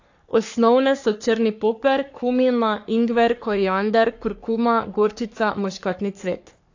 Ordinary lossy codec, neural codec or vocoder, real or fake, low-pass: AAC, 48 kbps; codec, 44.1 kHz, 3.4 kbps, Pupu-Codec; fake; 7.2 kHz